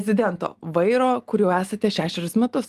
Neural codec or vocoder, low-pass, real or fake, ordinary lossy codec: none; 14.4 kHz; real; Opus, 24 kbps